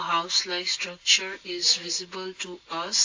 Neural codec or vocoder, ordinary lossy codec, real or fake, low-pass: none; AAC, 48 kbps; real; 7.2 kHz